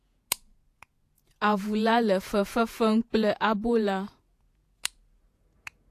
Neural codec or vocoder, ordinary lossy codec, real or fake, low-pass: vocoder, 48 kHz, 128 mel bands, Vocos; AAC, 64 kbps; fake; 14.4 kHz